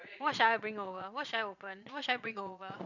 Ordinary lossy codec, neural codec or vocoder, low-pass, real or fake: none; vocoder, 22.05 kHz, 80 mel bands, Vocos; 7.2 kHz; fake